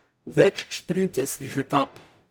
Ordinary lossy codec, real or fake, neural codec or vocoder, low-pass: none; fake; codec, 44.1 kHz, 0.9 kbps, DAC; none